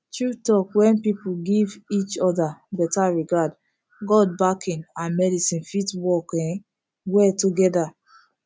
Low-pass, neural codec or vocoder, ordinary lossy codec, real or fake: none; none; none; real